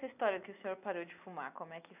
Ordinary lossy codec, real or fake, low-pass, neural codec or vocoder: none; real; 3.6 kHz; none